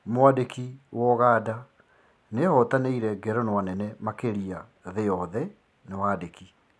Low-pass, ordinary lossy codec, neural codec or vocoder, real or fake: none; none; none; real